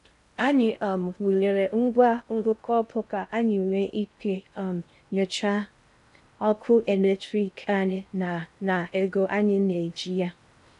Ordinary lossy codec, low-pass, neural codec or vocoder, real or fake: none; 10.8 kHz; codec, 16 kHz in and 24 kHz out, 0.6 kbps, FocalCodec, streaming, 4096 codes; fake